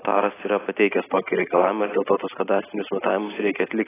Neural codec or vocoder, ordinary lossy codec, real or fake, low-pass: none; AAC, 16 kbps; real; 3.6 kHz